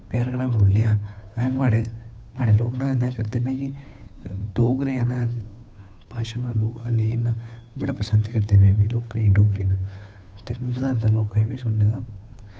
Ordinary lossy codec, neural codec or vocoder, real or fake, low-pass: none; codec, 16 kHz, 2 kbps, FunCodec, trained on Chinese and English, 25 frames a second; fake; none